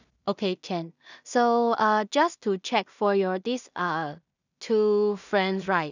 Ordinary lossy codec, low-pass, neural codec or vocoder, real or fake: none; 7.2 kHz; codec, 16 kHz in and 24 kHz out, 0.4 kbps, LongCat-Audio-Codec, two codebook decoder; fake